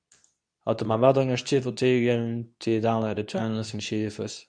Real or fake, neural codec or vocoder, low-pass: fake; codec, 24 kHz, 0.9 kbps, WavTokenizer, medium speech release version 2; 9.9 kHz